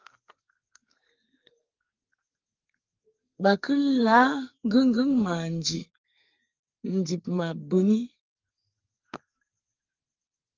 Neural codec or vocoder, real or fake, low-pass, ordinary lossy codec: codec, 44.1 kHz, 2.6 kbps, SNAC; fake; 7.2 kHz; Opus, 32 kbps